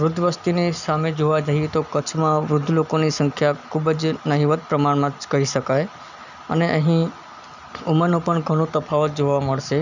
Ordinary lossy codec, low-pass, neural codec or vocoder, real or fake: none; 7.2 kHz; none; real